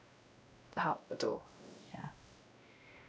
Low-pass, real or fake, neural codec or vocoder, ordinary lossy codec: none; fake; codec, 16 kHz, 0.5 kbps, X-Codec, WavLM features, trained on Multilingual LibriSpeech; none